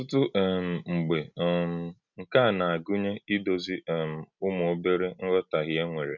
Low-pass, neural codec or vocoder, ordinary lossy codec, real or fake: 7.2 kHz; none; none; real